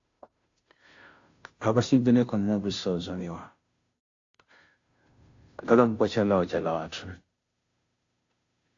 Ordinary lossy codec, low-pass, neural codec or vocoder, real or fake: AAC, 48 kbps; 7.2 kHz; codec, 16 kHz, 0.5 kbps, FunCodec, trained on Chinese and English, 25 frames a second; fake